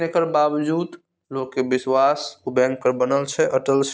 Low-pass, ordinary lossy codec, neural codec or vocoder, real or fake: none; none; none; real